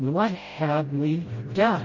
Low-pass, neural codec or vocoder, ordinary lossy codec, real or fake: 7.2 kHz; codec, 16 kHz, 0.5 kbps, FreqCodec, smaller model; MP3, 32 kbps; fake